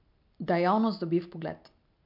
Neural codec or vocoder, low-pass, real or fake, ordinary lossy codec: none; 5.4 kHz; real; MP3, 48 kbps